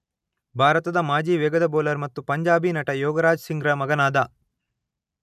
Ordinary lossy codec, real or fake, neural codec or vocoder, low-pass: none; real; none; 14.4 kHz